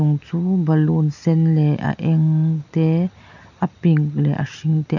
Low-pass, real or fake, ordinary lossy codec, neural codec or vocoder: 7.2 kHz; real; none; none